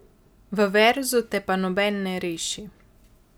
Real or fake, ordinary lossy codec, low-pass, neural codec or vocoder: real; none; none; none